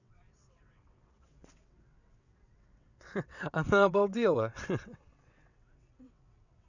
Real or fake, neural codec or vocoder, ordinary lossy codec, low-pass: fake; vocoder, 22.05 kHz, 80 mel bands, WaveNeXt; none; 7.2 kHz